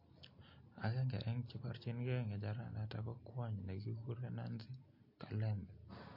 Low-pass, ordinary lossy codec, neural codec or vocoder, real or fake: 5.4 kHz; MP3, 32 kbps; none; real